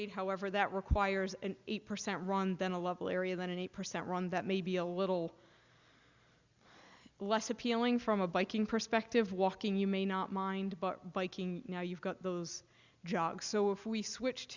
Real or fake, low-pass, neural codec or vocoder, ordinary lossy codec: real; 7.2 kHz; none; Opus, 64 kbps